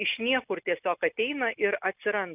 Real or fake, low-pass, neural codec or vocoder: real; 3.6 kHz; none